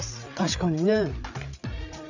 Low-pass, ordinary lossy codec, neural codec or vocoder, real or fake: 7.2 kHz; none; codec, 16 kHz, 8 kbps, FreqCodec, larger model; fake